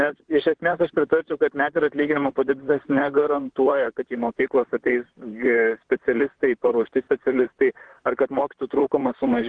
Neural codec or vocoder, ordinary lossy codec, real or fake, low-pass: vocoder, 44.1 kHz, 128 mel bands, Pupu-Vocoder; Opus, 16 kbps; fake; 9.9 kHz